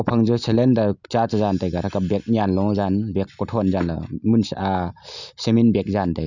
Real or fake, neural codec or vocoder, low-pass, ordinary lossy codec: real; none; 7.2 kHz; none